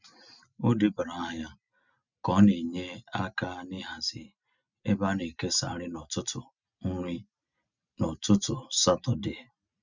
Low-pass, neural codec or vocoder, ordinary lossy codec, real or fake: 7.2 kHz; none; none; real